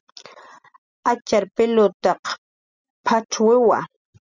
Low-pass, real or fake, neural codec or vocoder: 7.2 kHz; real; none